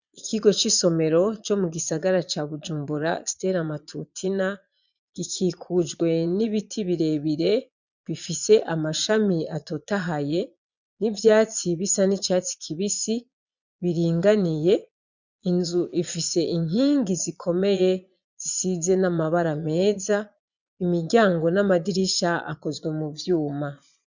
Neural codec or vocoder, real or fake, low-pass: vocoder, 22.05 kHz, 80 mel bands, Vocos; fake; 7.2 kHz